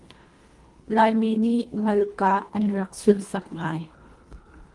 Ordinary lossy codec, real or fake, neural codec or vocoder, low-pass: Opus, 24 kbps; fake; codec, 24 kHz, 1.5 kbps, HILCodec; 10.8 kHz